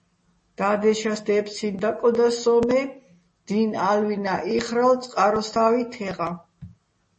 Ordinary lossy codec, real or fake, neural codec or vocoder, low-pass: MP3, 32 kbps; real; none; 10.8 kHz